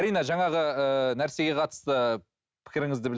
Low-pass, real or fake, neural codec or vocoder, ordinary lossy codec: none; real; none; none